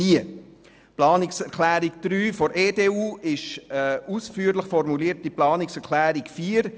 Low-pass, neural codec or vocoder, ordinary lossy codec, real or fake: none; none; none; real